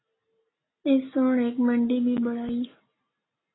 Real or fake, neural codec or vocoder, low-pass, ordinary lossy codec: real; none; 7.2 kHz; AAC, 16 kbps